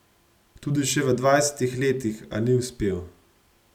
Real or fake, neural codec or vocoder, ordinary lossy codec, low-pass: real; none; none; 19.8 kHz